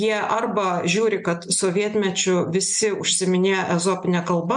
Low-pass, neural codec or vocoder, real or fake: 9.9 kHz; none; real